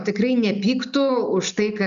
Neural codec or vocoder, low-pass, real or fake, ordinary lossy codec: none; 7.2 kHz; real; AAC, 96 kbps